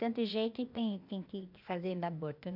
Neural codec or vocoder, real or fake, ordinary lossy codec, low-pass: codec, 16 kHz, 0.8 kbps, ZipCodec; fake; none; 5.4 kHz